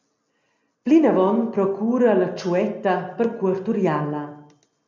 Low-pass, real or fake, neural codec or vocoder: 7.2 kHz; real; none